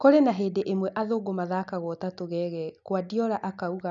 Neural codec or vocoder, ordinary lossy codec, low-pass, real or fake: none; none; 7.2 kHz; real